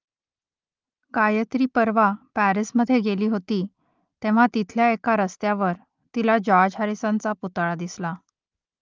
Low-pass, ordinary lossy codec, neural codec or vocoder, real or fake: 7.2 kHz; Opus, 24 kbps; none; real